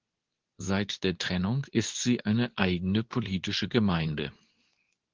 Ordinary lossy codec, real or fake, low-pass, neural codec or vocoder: Opus, 16 kbps; fake; 7.2 kHz; codec, 24 kHz, 0.9 kbps, WavTokenizer, medium speech release version 2